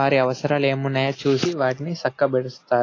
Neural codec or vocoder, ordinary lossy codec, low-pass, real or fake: none; AAC, 32 kbps; 7.2 kHz; real